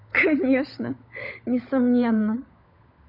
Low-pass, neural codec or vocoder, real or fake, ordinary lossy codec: 5.4 kHz; codec, 16 kHz, 16 kbps, FunCodec, trained on LibriTTS, 50 frames a second; fake; none